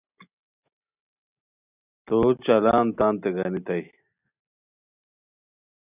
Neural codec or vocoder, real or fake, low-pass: none; real; 3.6 kHz